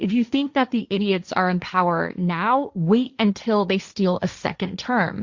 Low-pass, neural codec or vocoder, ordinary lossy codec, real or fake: 7.2 kHz; codec, 16 kHz, 1.1 kbps, Voila-Tokenizer; Opus, 64 kbps; fake